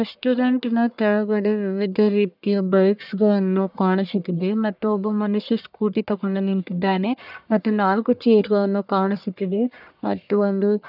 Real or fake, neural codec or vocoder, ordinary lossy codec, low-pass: fake; codec, 44.1 kHz, 1.7 kbps, Pupu-Codec; none; 5.4 kHz